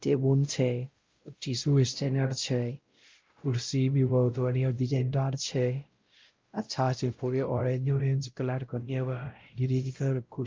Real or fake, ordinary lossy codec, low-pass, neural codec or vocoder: fake; Opus, 24 kbps; 7.2 kHz; codec, 16 kHz, 0.5 kbps, X-Codec, WavLM features, trained on Multilingual LibriSpeech